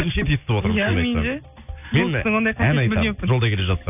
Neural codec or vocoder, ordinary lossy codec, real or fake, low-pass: none; none; real; 3.6 kHz